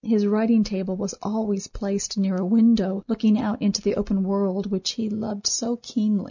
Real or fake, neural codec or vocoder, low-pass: real; none; 7.2 kHz